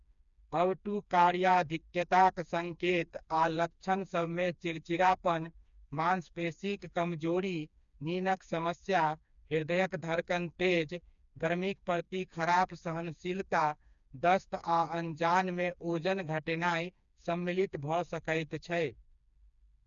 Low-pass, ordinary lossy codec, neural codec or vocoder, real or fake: 7.2 kHz; none; codec, 16 kHz, 2 kbps, FreqCodec, smaller model; fake